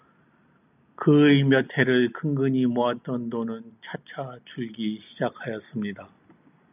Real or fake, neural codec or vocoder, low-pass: real; none; 3.6 kHz